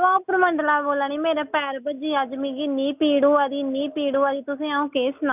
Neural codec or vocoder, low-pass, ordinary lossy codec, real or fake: none; 3.6 kHz; none; real